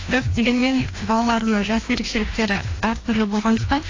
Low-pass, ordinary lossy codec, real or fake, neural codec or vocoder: 7.2 kHz; AAC, 32 kbps; fake; codec, 16 kHz, 1 kbps, FreqCodec, larger model